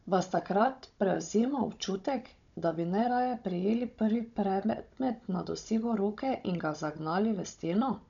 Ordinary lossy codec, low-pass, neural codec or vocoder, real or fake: none; 7.2 kHz; codec, 16 kHz, 16 kbps, FunCodec, trained on Chinese and English, 50 frames a second; fake